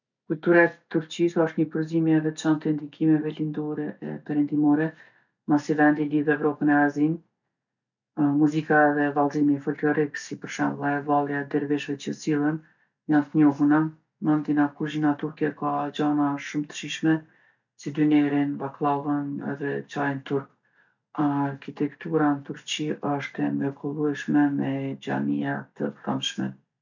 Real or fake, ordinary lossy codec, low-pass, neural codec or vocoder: real; none; 7.2 kHz; none